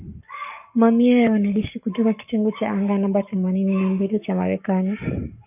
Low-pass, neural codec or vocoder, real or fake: 3.6 kHz; codec, 44.1 kHz, 7.8 kbps, Pupu-Codec; fake